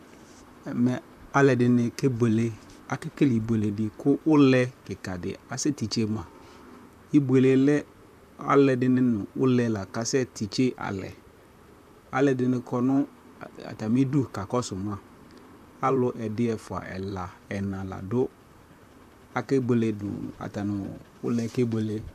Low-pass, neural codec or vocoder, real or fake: 14.4 kHz; vocoder, 44.1 kHz, 128 mel bands, Pupu-Vocoder; fake